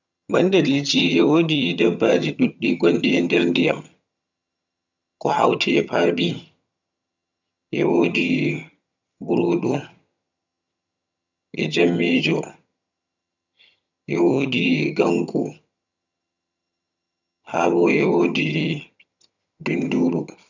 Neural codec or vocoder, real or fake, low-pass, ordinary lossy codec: vocoder, 22.05 kHz, 80 mel bands, HiFi-GAN; fake; 7.2 kHz; none